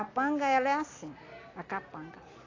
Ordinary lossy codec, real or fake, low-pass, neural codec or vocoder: AAC, 32 kbps; real; 7.2 kHz; none